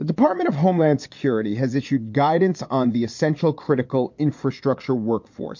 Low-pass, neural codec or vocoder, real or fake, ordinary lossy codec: 7.2 kHz; vocoder, 22.05 kHz, 80 mel bands, Vocos; fake; MP3, 48 kbps